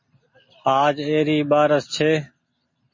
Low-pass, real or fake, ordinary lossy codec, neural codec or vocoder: 7.2 kHz; real; MP3, 32 kbps; none